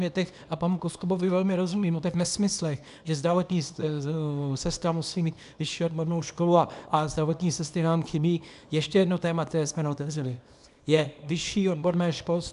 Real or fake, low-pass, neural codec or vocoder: fake; 10.8 kHz; codec, 24 kHz, 0.9 kbps, WavTokenizer, small release